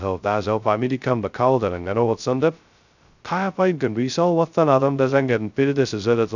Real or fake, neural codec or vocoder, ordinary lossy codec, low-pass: fake; codec, 16 kHz, 0.2 kbps, FocalCodec; none; 7.2 kHz